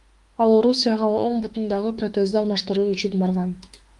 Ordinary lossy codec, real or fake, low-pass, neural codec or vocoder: Opus, 32 kbps; fake; 10.8 kHz; autoencoder, 48 kHz, 32 numbers a frame, DAC-VAE, trained on Japanese speech